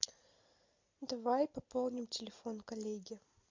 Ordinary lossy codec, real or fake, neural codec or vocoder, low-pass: MP3, 48 kbps; real; none; 7.2 kHz